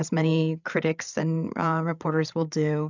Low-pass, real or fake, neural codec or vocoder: 7.2 kHz; fake; codec, 16 kHz, 16 kbps, FreqCodec, larger model